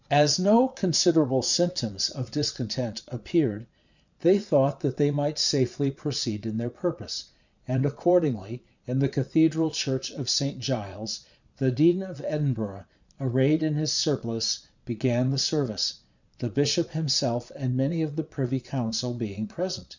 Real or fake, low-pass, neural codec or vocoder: fake; 7.2 kHz; vocoder, 22.05 kHz, 80 mel bands, Vocos